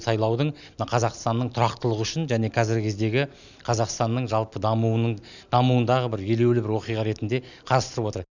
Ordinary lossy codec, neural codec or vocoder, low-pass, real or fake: none; none; 7.2 kHz; real